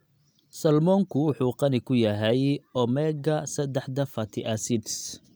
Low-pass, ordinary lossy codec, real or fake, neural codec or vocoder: none; none; real; none